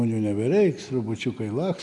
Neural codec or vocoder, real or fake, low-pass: none; real; 10.8 kHz